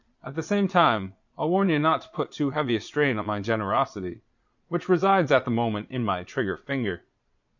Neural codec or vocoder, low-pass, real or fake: vocoder, 22.05 kHz, 80 mel bands, Vocos; 7.2 kHz; fake